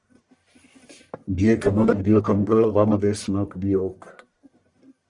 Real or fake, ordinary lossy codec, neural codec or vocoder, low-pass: fake; Opus, 64 kbps; codec, 44.1 kHz, 1.7 kbps, Pupu-Codec; 10.8 kHz